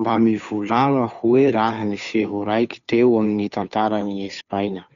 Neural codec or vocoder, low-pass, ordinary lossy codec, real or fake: codec, 16 kHz, 2 kbps, FunCodec, trained on Chinese and English, 25 frames a second; 7.2 kHz; none; fake